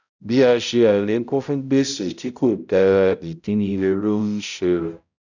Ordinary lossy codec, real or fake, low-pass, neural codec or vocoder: none; fake; 7.2 kHz; codec, 16 kHz, 0.5 kbps, X-Codec, HuBERT features, trained on balanced general audio